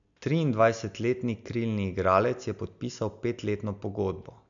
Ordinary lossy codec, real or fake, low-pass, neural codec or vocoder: none; real; 7.2 kHz; none